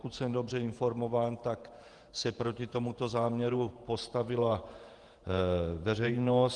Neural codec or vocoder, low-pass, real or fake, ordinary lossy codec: vocoder, 48 kHz, 128 mel bands, Vocos; 10.8 kHz; fake; Opus, 24 kbps